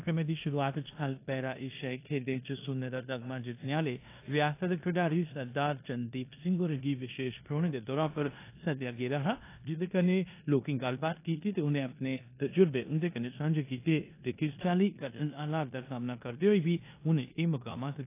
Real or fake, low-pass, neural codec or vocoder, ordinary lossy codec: fake; 3.6 kHz; codec, 16 kHz in and 24 kHz out, 0.9 kbps, LongCat-Audio-Codec, four codebook decoder; AAC, 24 kbps